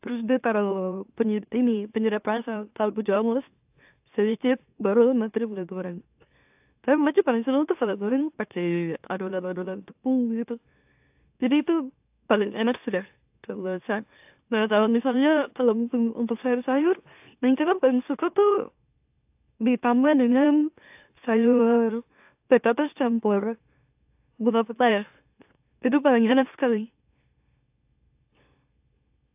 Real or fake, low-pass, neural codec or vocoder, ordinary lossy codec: fake; 3.6 kHz; autoencoder, 44.1 kHz, a latent of 192 numbers a frame, MeloTTS; AAC, 32 kbps